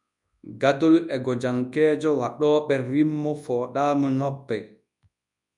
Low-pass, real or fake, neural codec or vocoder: 10.8 kHz; fake; codec, 24 kHz, 0.9 kbps, WavTokenizer, large speech release